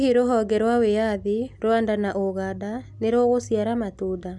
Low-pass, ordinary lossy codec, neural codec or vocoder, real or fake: none; none; none; real